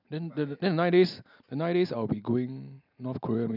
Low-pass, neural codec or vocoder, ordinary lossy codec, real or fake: 5.4 kHz; none; none; real